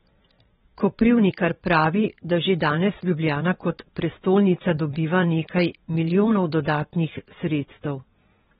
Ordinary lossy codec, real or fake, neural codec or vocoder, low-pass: AAC, 16 kbps; fake; vocoder, 22.05 kHz, 80 mel bands, WaveNeXt; 9.9 kHz